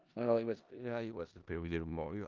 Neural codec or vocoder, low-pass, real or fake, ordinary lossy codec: codec, 16 kHz in and 24 kHz out, 0.4 kbps, LongCat-Audio-Codec, four codebook decoder; 7.2 kHz; fake; Opus, 24 kbps